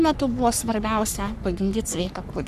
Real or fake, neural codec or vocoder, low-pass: fake; codec, 44.1 kHz, 3.4 kbps, Pupu-Codec; 14.4 kHz